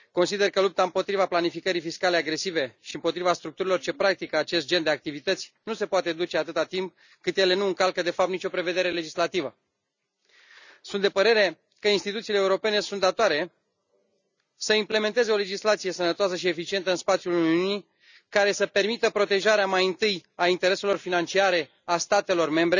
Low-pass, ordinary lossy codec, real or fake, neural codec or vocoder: 7.2 kHz; none; real; none